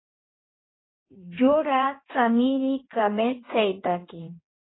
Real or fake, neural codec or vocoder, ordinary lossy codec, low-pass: fake; codec, 16 kHz in and 24 kHz out, 1.1 kbps, FireRedTTS-2 codec; AAC, 16 kbps; 7.2 kHz